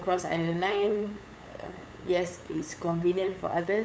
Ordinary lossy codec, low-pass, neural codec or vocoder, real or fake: none; none; codec, 16 kHz, 8 kbps, FunCodec, trained on LibriTTS, 25 frames a second; fake